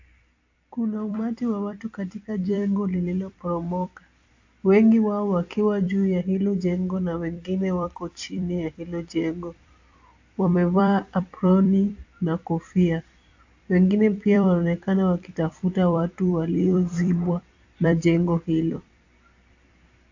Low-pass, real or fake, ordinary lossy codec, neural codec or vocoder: 7.2 kHz; fake; AAC, 48 kbps; vocoder, 44.1 kHz, 128 mel bands every 256 samples, BigVGAN v2